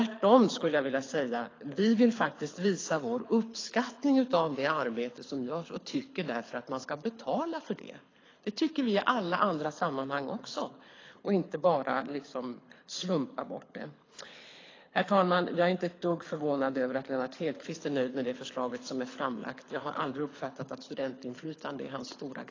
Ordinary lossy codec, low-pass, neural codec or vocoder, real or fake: AAC, 32 kbps; 7.2 kHz; codec, 24 kHz, 6 kbps, HILCodec; fake